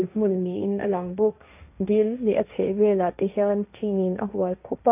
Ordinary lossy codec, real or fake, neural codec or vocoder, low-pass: AAC, 32 kbps; fake; codec, 16 kHz, 1.1 kbps, Voila-Tokenizer; 3.6 kHz